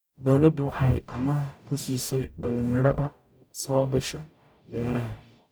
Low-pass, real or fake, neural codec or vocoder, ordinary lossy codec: none; fake; codec, 44.1 kHz, 0.9 kbps, DAC; none